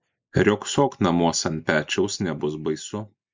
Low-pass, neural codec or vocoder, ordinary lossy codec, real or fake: 7.2 kHz; none; MP3, 64 kbps; real